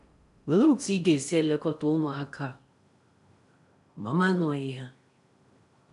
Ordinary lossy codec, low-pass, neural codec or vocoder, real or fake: none; 10.8 kHz; codec, 16 kHz in and 24 kHz out, 0.6 kbps, FocalCodec, streaming, 4096 codes; fake